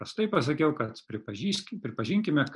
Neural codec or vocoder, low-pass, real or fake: none; 10.8 kHz; real